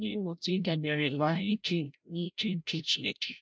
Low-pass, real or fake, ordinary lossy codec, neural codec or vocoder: none; fake; none; codec, 16 kHz, 0.5 kbps, FreqCodec, larger model